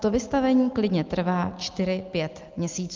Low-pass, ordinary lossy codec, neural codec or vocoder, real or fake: 7.2 kHz; Opus, 32 kbps; none; real